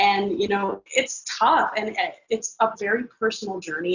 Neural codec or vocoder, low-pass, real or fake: none; 7.2 kHz; real